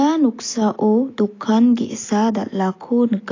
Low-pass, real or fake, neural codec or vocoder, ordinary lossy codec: 7.2 kHz; real; none; AAC, 32 kbps